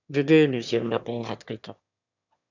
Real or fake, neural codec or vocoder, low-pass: fake; autoencoder, 22.05 kHz, a latent of 192 numbers a frame, VITS, trained on one speaker; 7.2 kHz